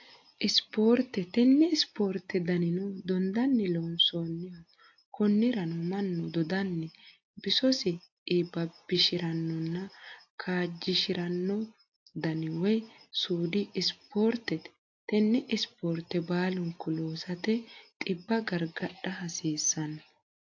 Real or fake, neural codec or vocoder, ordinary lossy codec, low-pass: real; none; AAC, 48 kbps; 7.2 kHz